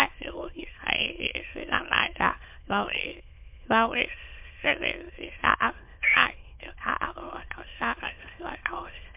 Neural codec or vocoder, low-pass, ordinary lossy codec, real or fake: autoencoder, 22.05 kHz, a latent of 192 numbers a frame, VITS, trained on many speakers; 3.6 kHz; MP3, 32 kbps; fake